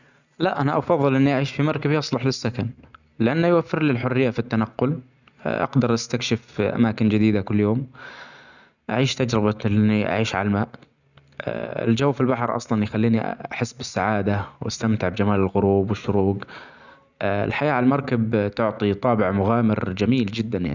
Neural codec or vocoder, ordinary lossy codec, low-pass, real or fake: none; none; 7.2 kHz; real